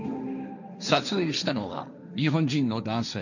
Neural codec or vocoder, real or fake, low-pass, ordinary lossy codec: codec, 16 kHz, 1.1 kbps, Voila-Tokenizer; fake; 7.2 kHz; none